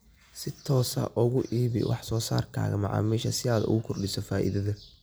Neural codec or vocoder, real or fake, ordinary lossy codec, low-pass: none; real; none; none